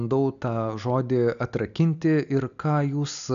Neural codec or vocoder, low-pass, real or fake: none; 7.2 kHz; real